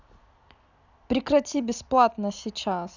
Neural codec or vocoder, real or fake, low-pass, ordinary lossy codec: none; real; 7.2 kHz; none